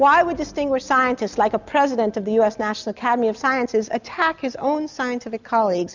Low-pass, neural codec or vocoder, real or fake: 7.2 kHz; none; real